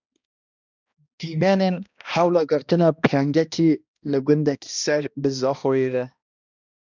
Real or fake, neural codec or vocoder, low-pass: fake; codec, 16 kHz, 1 kbps, X-Codec, HuBERT features, trained on balanced general audio; 7.2 kHz